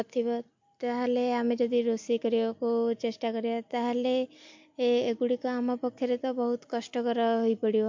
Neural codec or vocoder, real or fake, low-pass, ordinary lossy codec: none; real; 7.2 kHz; MP3, 48 kbps